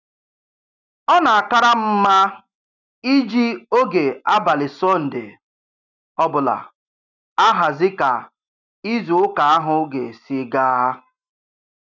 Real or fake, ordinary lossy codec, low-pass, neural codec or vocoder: real; none; 7.2 kHz; none